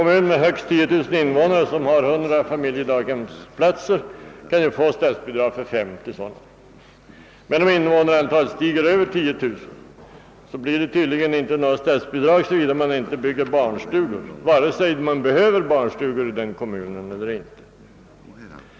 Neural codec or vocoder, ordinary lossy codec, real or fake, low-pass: none; none; real; none